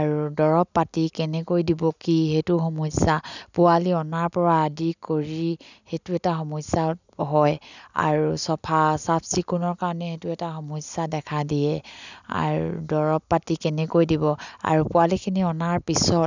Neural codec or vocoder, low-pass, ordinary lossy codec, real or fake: none; 7.2 kHz; none; real